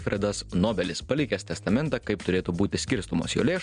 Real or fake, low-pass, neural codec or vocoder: real; 9.9 kHz; none